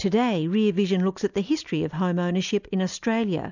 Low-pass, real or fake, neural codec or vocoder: 7.2 kHz; real; none